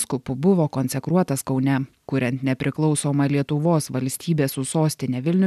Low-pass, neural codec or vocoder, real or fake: 14.4 kHz; none; real